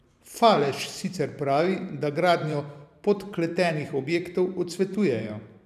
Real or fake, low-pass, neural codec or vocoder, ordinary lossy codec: fake; 14.4 kHz; vocoder, 44.1 kHz, 128 mel bands every 512 samples, BigVGAN v2; none